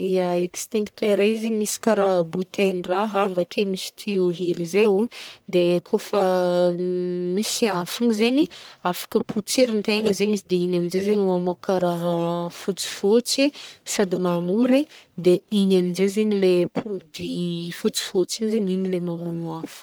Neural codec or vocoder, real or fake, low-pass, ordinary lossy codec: codec, 44.1 kHz, 1.7 kbps, Pupu-Codec; fake; none; none